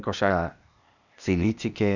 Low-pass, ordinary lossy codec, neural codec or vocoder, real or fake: 7.2 kHz; none; codec, 16 kHz, 0.8 kbps, ZipCodec; fake